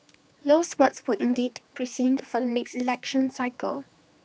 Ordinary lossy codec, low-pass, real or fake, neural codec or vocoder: none; none; fake; codec, 16 kHz, 2 kbps, X-Codec, HuBERT features, trained on general audio